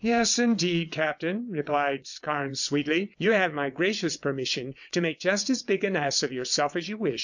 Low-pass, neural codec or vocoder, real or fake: 7.2 kHz; vocoder, 22.05 kHz, 80 mel bands, WaveNeXt; fake